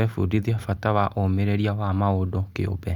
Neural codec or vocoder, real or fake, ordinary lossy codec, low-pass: none; real; Opus, 64 kbps; 19.8 kHz